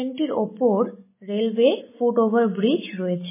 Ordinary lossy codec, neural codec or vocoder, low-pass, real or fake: MP3, 16 kbps; none; 3.6 kHz; real